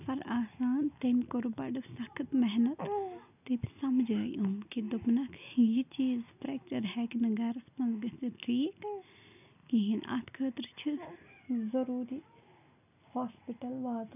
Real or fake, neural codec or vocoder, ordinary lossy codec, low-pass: real; none; none; 3.6 kHz